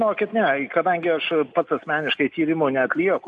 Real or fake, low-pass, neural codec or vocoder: real; 10.8 kHz; none